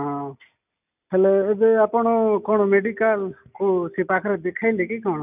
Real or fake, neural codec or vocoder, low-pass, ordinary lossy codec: real; none; 3.6 kHz; none